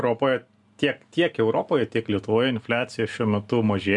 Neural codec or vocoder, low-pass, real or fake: none; 10.8 kHz; real